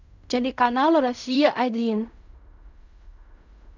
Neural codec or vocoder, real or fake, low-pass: codec, 16 kHz in and 24 kHz out, 0.4 kbps, LongCat-Audio-Codec, fine tuned four codebook decoder; fake; 7.2 kHz